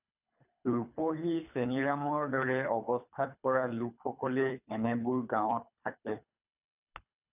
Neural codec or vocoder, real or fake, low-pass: codec, 24 kHz, 3 kbps, HILCodec; fake; 3.6 kHz